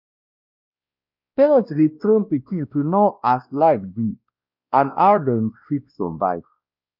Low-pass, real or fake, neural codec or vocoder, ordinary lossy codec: 5.4 kHz; fake; codec, 16 kHz, 1 kbps, X-Codec, WavLM features, trained on Multilingual LibriSpeech; none